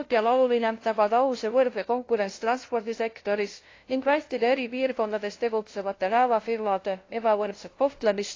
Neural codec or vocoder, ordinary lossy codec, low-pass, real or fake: codec, 16 kHz, 0.5 kbps, FunCodec, trained on LibriTTS, 25 frames a second; AAC, 32 kbps; 7.2 kHz; fake